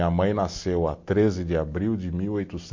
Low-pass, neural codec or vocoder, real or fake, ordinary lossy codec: 7.2 kHz; none; real; MP3, 48 kbps